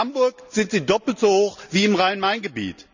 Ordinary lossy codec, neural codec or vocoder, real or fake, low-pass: none; none; real; 7.2 kHz